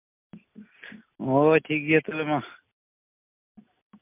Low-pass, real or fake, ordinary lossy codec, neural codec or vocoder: 3.6 kHz; real; MP3, 32 kbps; none